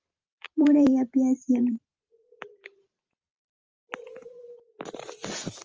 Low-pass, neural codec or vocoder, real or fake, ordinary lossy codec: 7.2 kHz; vocoder, 44.1 kHz, 128 mel bands every 512 samples, BigVGAN v2; fake; Opus, 24 kbps